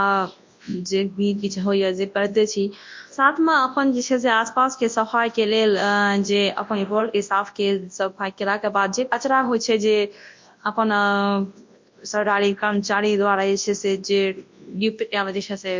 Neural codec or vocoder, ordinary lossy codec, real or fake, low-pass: codec, 24 kHz, 0.9 kbps, WavTokenizer, large speech release; MP3, 48 kbps; fake; 7.2 kHz